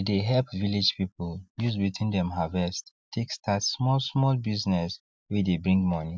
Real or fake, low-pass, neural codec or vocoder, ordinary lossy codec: real; none; none; none